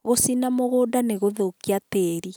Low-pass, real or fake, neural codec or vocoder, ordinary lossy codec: none; real; none; none